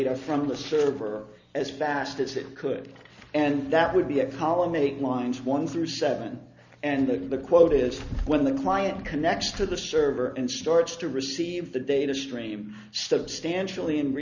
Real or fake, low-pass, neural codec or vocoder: real; 7.2 kHz; none